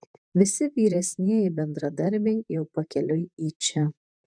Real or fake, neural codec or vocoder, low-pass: fake; vocoder, 44.1 kHz, 128 mel bands, Pupu-Vocoder; 9.9 kHz